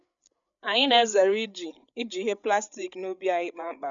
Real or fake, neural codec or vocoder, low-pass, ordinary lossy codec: fake; codec, 16 kHz, 8 kbps, FreqCodec, larger model; 7.2 kHz; none